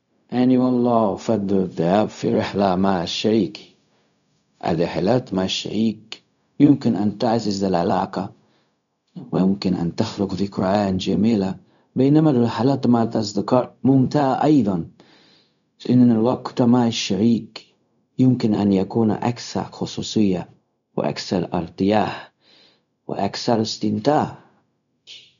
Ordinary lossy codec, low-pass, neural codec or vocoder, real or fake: none; 7.2 kHz; codec, 16 kHz, 0.4 kbps, LongCat-Audio-Codec; fake